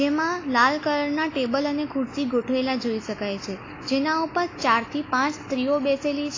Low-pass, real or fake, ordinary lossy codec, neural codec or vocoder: 7.2 kHz; real; AAC, 32 kbps; none